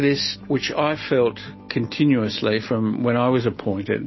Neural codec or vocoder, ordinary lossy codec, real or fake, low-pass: none; MP3, 24 kbps; real; 7.2 kHz